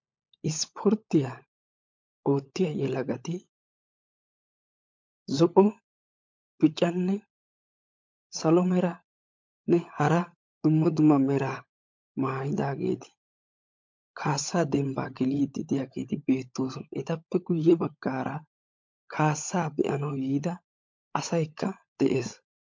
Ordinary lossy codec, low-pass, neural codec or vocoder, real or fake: MP3, 48 kbps; 7.2 kHz; codec, 16 kHz, 16 kbps, FunCodec, trained on LibriTTS, 50 frames a second; fake